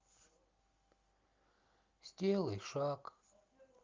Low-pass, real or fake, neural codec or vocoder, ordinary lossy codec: 7.2 kHz; real; none; Opus, 32 kbps